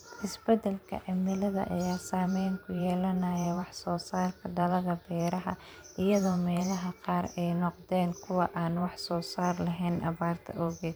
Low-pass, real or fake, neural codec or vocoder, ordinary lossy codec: none; fake; vocoder, 44.1 kHz, 128 mel bands every 512 samples, BigVGAN v2; none